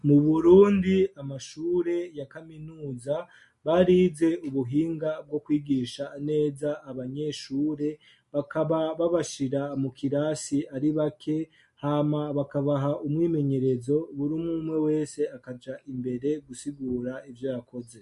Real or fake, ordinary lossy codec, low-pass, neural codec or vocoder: real; MP3, 48 kbps; 14.4 kHz; none